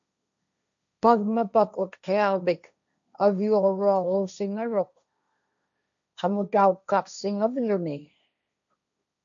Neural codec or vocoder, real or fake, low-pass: codec, 16 kHz, 1.1 kbps, Voila-Tokenizer; fake; 7.2 kHz